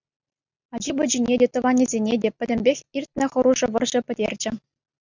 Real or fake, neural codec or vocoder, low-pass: real; none; 7.2 kHz